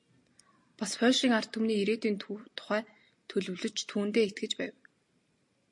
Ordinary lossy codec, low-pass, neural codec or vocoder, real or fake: MP3, 48 kbps; 10.8 kHz; none; real